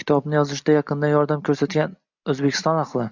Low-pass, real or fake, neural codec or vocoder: 7.2 kHz; real; none